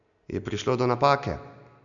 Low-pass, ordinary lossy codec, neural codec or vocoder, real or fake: 7.2 kHz; none; none; real